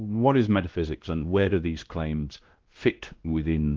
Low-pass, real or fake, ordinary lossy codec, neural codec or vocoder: 7.2 kHz; fake; Opus, 16 kbps; codec, 16 kHz, 1 kbps, X-Codec, WavLM features, trained on Multilingual LibriSpeech